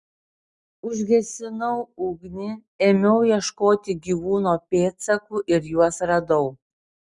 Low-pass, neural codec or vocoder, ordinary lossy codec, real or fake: 10.8 kHz; none; Opus, 64 kbps; real